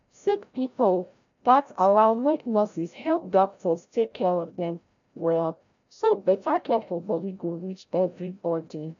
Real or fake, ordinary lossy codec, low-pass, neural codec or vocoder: fake; none; 7.2 kHz; codec, 16 kHz, 0.5 kbps, FreqCodec, larger model